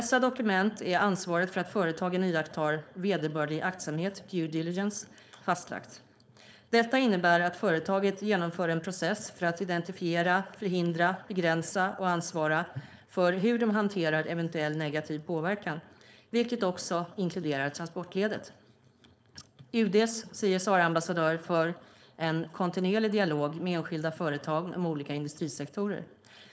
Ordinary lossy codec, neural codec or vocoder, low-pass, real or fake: none; codec, 16 kHz, 4.8 kbps, FACodec; none; fake